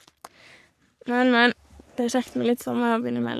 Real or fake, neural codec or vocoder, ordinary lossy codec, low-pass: fake; codec, 44.1 kHz, 3.4 kbps, Pupu-Codec; none; 14.4 kHz